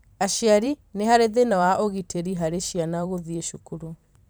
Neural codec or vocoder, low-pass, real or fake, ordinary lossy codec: vocoder, 44.1 kHz, 128 mel bands every 512 samples, BigVGAN v2; none; fake; none